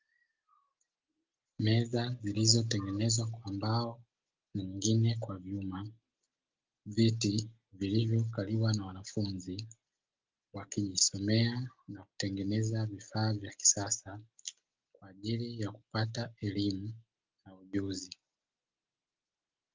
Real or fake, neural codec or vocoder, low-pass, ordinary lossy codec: real; none; 7.2 kHz; Opus, 24 kbps